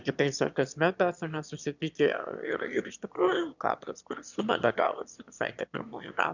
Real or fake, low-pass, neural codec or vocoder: fake; 7.2 kHz; autoencoder, 22.05 kHz, a latent of 192 numbers a frame, VITS, trained on one speaker